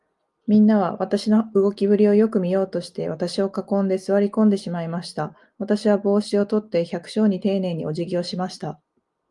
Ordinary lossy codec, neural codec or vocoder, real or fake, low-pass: Opus, 32 kbps; none; real; 10.8 kHz